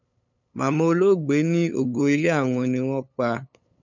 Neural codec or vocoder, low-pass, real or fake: codec, 16 kHz, 8 kbps, FunCodec, trained on LibriTTS, 25 frames a second; 7.2 kHz; fake